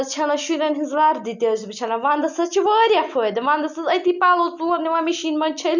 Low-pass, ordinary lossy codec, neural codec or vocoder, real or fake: 7.2 kHz; none; none; real